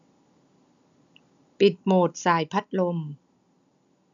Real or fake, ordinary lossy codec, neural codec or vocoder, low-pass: real; MP3, 96 kbps; none; 7.2 kHz